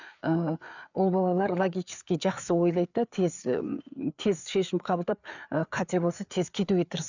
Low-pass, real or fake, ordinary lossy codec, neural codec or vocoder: 7.2 kHz; fake; none; codec, 16 kHz, 4 kbps, FreqCodec, larger model